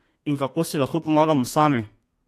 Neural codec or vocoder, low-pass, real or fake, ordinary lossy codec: codec, 32 kHz, 1.9 kbps, SNAC; 14.4 kHz; fake; AAC, 64 kbps